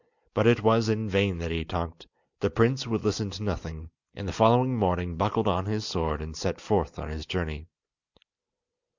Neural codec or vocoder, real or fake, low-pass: none; real; 7.2 kHz